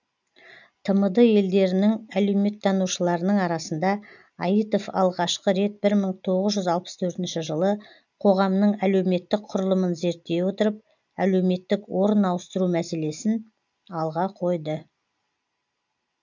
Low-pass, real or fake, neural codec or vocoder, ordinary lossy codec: 7.2 kHz; real; none; none